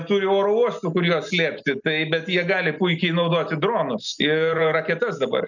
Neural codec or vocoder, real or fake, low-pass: none; real; 7.2 kHz